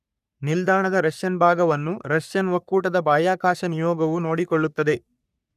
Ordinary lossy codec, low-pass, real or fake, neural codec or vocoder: none; 14.4 kHz; fake; codec, 44.1 kHz, 3.4 kbps, Pupu-Codec